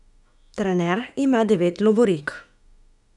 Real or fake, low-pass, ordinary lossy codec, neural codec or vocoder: fake; 10.8 kHz; none; autoencoder, 48 kHz, 32 numbers a frame, DAC-VAE, trained on Japanese speech